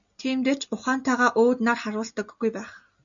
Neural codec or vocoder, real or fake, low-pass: none; real; 7.2 kHz